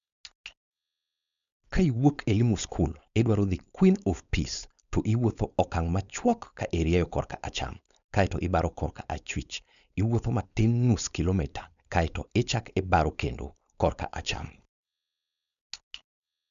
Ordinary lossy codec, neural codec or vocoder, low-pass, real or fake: MP3, 96 kbps; codec, 16 kHz, 4.8 kbps, FACodec; 7.2 kHz; fake